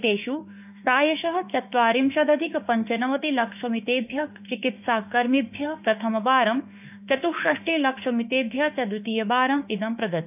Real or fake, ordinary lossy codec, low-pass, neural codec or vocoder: fake; none; 3.6 kHz; autoencoder, 48 kHz, 32 numbers a frame, DAC-VAE, trained on Japanese speech